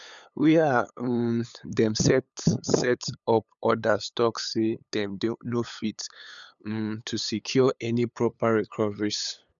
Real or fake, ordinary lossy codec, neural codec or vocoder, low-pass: fake; none; codec, 16 kHz, 8 kbps, FunCodec, trained on LibriTTS, 25 frames a second; 7.2 kHz